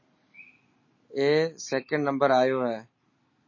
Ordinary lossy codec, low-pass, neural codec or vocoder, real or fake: MP3, 32 kbps; 7.2 kHz; none; real